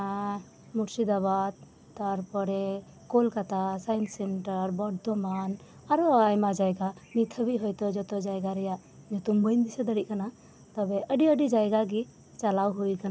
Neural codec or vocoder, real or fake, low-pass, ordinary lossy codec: none; real; none; none